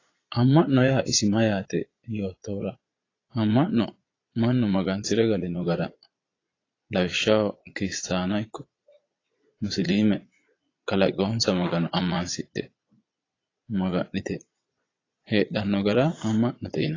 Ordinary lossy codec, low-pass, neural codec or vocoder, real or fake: AAC, 32 kbps; 7.2 kHz; vocoder, 22.05 kHz, 80 mel bands, Vocos; fake